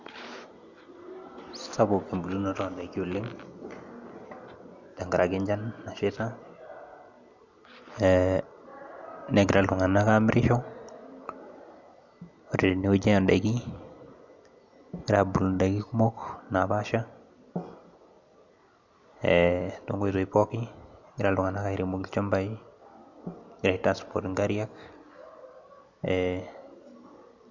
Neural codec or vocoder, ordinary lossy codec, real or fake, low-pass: none; none; real; 7.2 kHz